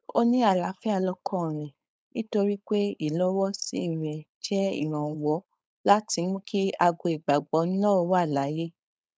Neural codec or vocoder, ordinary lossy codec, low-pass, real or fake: codec, 16 kHz, 4.8 kbps, FACodec; none; none; fake